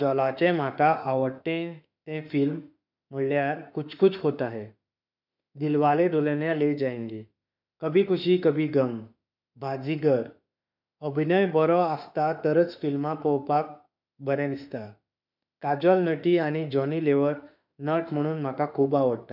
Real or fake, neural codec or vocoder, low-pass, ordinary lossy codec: fake; autoencoder, 48 kHz, 32 numbers a frame, DAC-VAE, trained on Japanese speech; 5.4 kHz; none